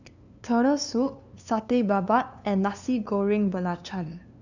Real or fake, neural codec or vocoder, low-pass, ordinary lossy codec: fake; codec, 16 kHz, 2 kbps, FunCodec, trained on LibriTTS, 25 frames a second; 7.2 kHz; none